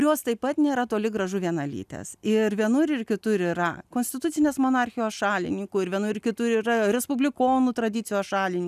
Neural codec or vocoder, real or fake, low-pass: none; real; 14.4 kHz